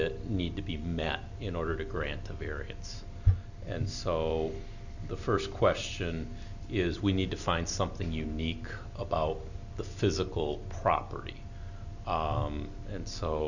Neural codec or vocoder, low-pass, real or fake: none; 7.2 kHz; real